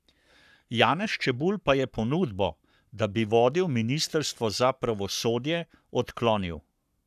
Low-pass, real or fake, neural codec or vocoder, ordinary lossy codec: 14.4 kHz; fake; codec, 44.1 kHz, 7.8 kbps, Pupu-Codec; none